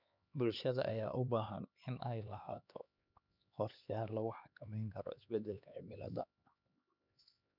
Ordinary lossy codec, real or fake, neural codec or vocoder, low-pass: none; fake; codec, 16 kHz, 2 kbps, X-Codec, HuBERT features, trained on LibriSpeech; 5.4 kHz